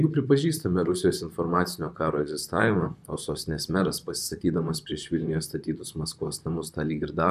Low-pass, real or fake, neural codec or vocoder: 14.4 kHz; fake; vocoder, 44.1 kHz, 128 mel bands, Pupu-Vocoder